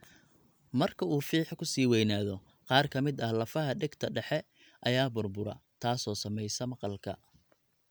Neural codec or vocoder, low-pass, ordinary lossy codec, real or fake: none; none; none; real